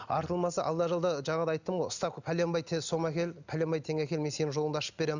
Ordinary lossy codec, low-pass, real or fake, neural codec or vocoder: none; 7.2 kHz; real; none